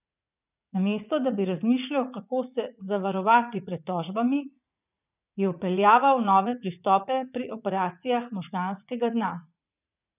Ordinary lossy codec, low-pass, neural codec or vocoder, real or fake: none; 3.6 kHz; vocoder, 44.1 kHz, 80 mel bands, Vocos; fake